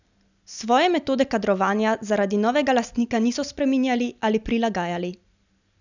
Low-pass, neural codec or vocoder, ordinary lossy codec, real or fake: 7.2 kHz; none; none; real